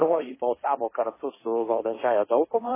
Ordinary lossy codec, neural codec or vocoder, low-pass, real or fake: MP3, 16 kbps; codec, 16 kHz, 1.1 kbps, Voila-Tokenizer; 3.6 kHz; fake